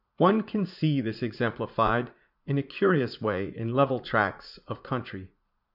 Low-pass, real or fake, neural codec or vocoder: 5.4 kHz; fake; vocoder, 44.1 kHz, 80 mel bands, Vocos